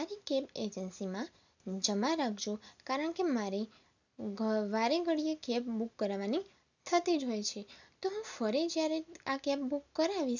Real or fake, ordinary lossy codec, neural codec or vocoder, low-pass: real; none; none; 7.2 kHz